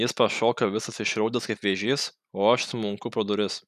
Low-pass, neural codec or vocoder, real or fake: 14.4 kHz; none; real